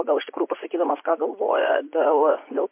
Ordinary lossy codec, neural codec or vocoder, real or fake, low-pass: MP3, 24 kbps; vocoder, 24 kHz, 100 mel bands, Vocos; fake; 3.6 kHz